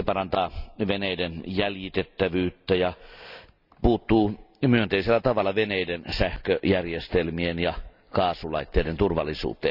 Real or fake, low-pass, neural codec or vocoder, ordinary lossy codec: real; 5.4 kHz; none; none